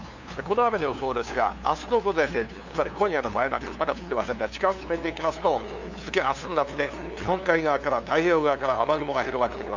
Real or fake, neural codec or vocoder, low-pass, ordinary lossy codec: fake; codec, 16 kHz, 2 kbps, FunCodec, trained on LibriTTS, 25 frames a second; 7.2 kHz; none